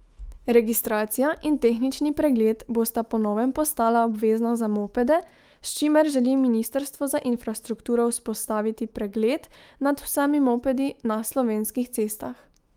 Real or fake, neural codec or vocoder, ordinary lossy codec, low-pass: fake; autoencoder, 48 kHz, 128 numbers a frame, DAC-VAE, trained on Japanese speech; Opus, 32 kbps; 19.8 kHz